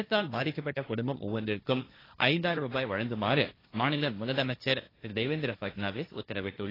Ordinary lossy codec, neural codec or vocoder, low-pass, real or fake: AAC, 24 kbps; codec, 16 kHz, 1.1 kbps, Voila-Tokenizer; 5.4 kHz; fake